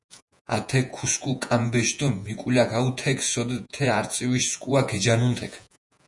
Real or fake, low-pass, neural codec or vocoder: fake; 10.8 kHz; vocoder, 48 kHz, 128 mel bands, Vocos